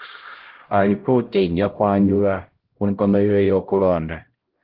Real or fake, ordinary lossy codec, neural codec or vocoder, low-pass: fake; Opus, 16 kbps; codec, 16 kHz, 0.5 kbps, X-Codec, HuBERT features, trained on LibriSpeech; 5.4 kHz